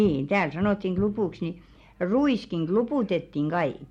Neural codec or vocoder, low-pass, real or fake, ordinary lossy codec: none; 19.8 kHz; real; MP3, 64 kbps